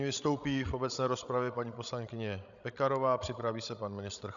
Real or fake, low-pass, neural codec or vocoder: fake; 7.2 kHz; codec, 16 kHz, 16 kbps, FreqCodec, larger model